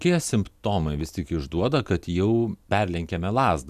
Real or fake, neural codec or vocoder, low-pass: real; none; 14.4 kHz